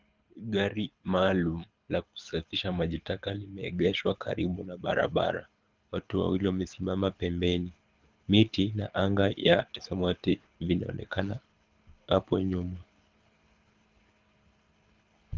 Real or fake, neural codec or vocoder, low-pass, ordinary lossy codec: fake; codec, 24 kHz, 6 kbps, HILCodec; 7.2 kHz; Opus, 24 kbps